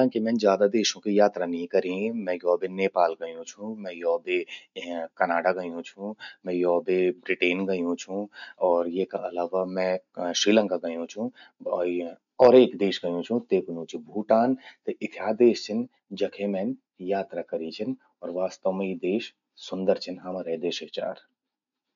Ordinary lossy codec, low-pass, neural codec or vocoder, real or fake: none; 7.2 kHz; none; real